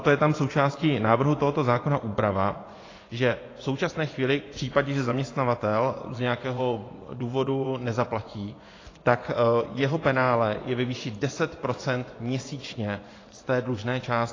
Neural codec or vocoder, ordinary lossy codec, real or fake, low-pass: vocoder, 24 kHz, 100 mel bands, Vocos; AAC, 32 kbps; fake; 7.2 kHz